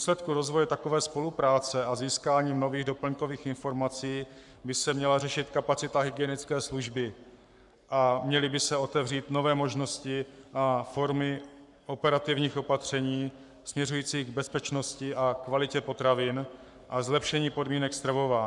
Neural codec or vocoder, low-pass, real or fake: codec, 44.1 kHz, 7.8 kbps, Pupu-Codec; 10.8 kHz; fake